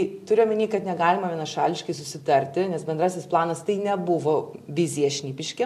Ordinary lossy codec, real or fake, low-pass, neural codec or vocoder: MP3, 64 kbps; real; 14.4 kHz; none